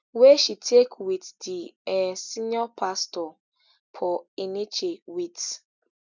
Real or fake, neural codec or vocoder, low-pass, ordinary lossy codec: real; none; 7.2 kHz; none